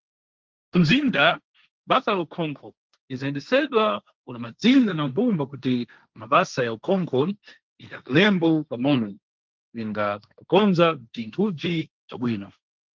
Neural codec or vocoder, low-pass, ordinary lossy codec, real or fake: codec, 16 kHz, 1.1 kbps, Voila-Tokenizer; 7.2 kHz; Opus, 24 kbps; fake